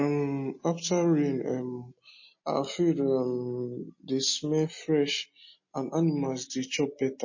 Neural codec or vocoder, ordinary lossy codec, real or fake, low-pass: none; MP3, 32 kbps; real; 7.2 kHz